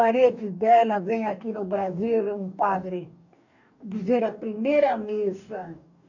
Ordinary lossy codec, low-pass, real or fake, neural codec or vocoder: none; 7.2 kHz; fake; codec, 44.1 kHz, 2.6 kbps, DAC